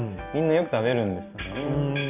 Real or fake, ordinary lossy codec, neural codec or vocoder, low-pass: real; none; none; 3.6 kHz